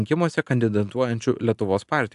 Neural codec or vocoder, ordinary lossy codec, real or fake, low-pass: none; AAC, 96 kbps; real; 10.8 kHz